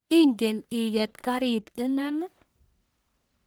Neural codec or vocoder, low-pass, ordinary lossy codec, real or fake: codec, 44.1 kHz, 1.7 kbps, Pupu-Codec; none; none; fake